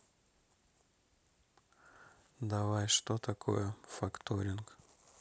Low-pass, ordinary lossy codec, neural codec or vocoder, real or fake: none; none; none; real